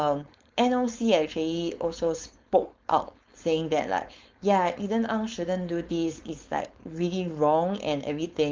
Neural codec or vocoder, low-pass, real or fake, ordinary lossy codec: codec, 16 kHz, 4.8 kbps, FACodec; 7.2 kHz; fake; Opus, 32 kbps